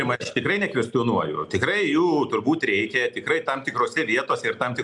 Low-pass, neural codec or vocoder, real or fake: 10.8 kHz; none; real